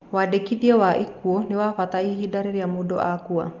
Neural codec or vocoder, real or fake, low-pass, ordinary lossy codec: none; real; 7.2 kHz; Opus, 24 kbps